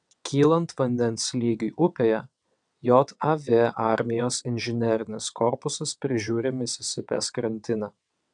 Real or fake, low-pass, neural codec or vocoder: fake; 9.9 kHz; vocoder, 22.05 kHz, 80 mel bands, WaveNeXt